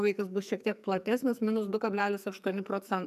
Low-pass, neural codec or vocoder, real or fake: 14.4 kHz; codec, 44.1 kHz, 2.6 kbps, SNAC; fake